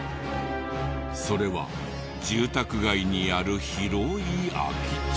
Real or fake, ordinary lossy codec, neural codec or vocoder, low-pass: real; none; none; none